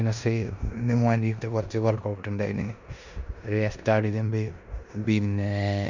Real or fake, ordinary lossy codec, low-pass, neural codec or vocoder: fake; none; 7.2 kHz; codec, 16 kHz in and 24 kHz out, 0.9 kbps, LongCat-Audio-Codec, four codebook decoder